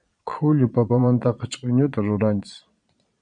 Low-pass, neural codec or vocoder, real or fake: 9.9 kHz; vocoder, 22.05 kHz, 80 mel bands, Vocos; fake